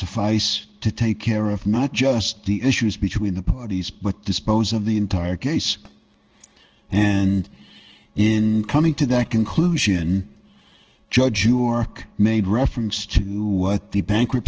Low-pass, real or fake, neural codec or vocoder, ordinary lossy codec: 7.2 kHz; fake; codec, 16 kHz in and 24 kHz out, 1 kbps, XY-Tokenizer; Opus, 16 kbps